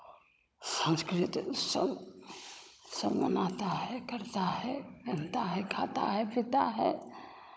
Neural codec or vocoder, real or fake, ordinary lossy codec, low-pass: codec, 16 kHz, 16 kbps, FunCodec, trained on Chinese and English, 50 frames a second; fake; none; none